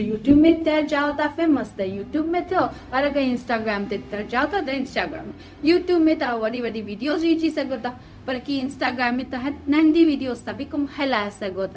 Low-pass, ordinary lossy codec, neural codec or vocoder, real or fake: none; none; codec, 16 kHz, 0.4 kbps, LongCat-Audio-Codec; fake